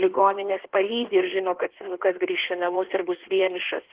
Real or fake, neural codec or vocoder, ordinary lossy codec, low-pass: fake; codec, 16 kHz in and 24 kHz out, 1.1 kbps, FireRedTTS-2 codec; Opus, 16 kbps; 3.6 kHz